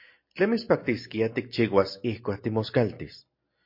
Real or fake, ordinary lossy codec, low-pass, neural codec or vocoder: real; MP3, 32 kbps; 5.4 kHz; none